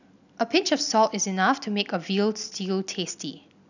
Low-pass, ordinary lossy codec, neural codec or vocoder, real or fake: 7.2 kHz; none; none; real